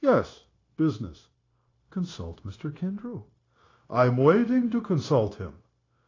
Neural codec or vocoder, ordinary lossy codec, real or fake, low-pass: codec, 16 kHz, 0.9 kbps, LongCat-Audio-Codec; AAC, 32 kbps; fake; 7.2 kHz